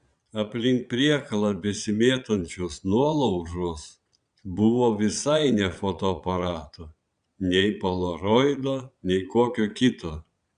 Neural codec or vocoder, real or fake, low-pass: vocoder, 22.05 kHz, 80 mel bands, Vocos; fake; 9.9 kHz